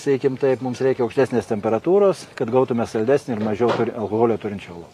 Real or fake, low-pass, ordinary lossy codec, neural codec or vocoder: fake; 14.4 kHz; AAC, 48 kbps; vocoder, 44.1 kHz, 128 mel bands every 512 samples, BigVGAN v2